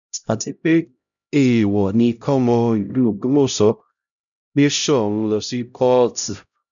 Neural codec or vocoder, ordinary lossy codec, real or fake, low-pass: codec, 16 kHz, 0.5 kbps, X-Codec, HuBERT features, trained on LibriSpeech; none; fake; 7.2 kHz